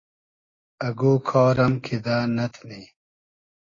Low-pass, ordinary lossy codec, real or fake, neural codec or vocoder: 7.2 kHz; AAC, 32 kbps; real; none